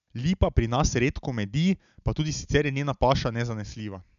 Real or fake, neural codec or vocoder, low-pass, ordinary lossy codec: real; none; 7.2 kHz; none